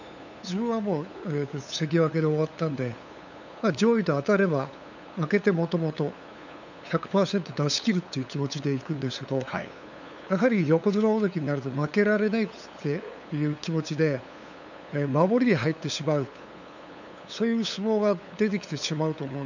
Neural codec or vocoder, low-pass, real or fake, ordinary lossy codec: codec, 16 kHz, 8 kbps, FunCodec, trained on LibriTTS, 25 frames a second; 7.2 kHz; fake; none